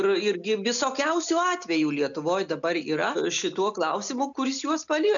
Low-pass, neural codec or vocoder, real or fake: 7.2 kHz; none; real